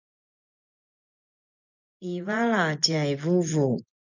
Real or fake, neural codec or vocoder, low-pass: fake; vocoder, 24 kHz, 100 mel bands, Vocos; 7.2 kHz